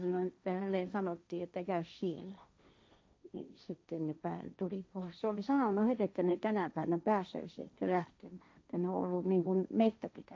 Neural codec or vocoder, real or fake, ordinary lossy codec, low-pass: codec, 16 kHz, 1.1 kbps, Voila-Tokenizer; fake; none; none